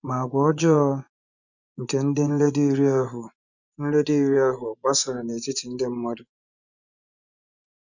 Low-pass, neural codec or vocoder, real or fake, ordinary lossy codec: 7.2 kHz; vocoder, 24 kHz, 100 mel bands, Vocos; fake; none